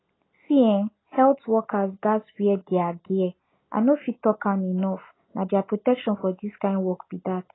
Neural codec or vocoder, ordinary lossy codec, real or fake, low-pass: none; AAC, 16 kbps; real; 7.2 kHz